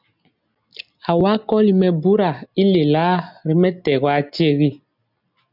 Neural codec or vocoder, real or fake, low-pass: none; real; 5.4 kHz